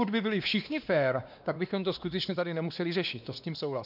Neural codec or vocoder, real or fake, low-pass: codec, 16 kHz, 2 kbps, X-Codec, WavLM features, trained on Multilingual LibriSpeech; fake; 5.4 kHz